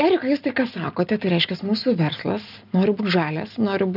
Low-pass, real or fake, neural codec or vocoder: 5.4 kHz; real; none